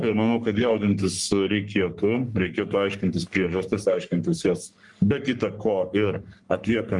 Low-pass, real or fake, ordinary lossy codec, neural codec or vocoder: 10.8 kHz; fake; Opus, 32 kbps; codec, 44.1 kHz, 3.4 kbps, Pupu-Codec